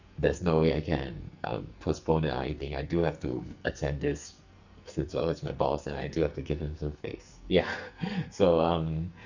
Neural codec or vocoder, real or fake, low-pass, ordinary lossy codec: codec, 44.1 kHz, 2.6 kbps, SNAC; fake; 7.2 kHz; Opus, 64 kbps